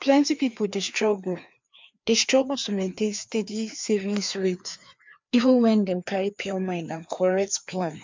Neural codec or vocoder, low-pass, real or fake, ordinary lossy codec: codec, 16 kHz, 2 kbps, FreqCodec, larger model; 7.2 kHz; fake; MP3, 64 kbps